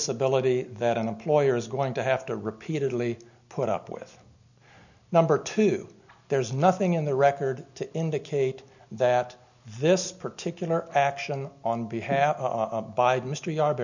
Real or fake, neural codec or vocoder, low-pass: real; none; 7.2 kHz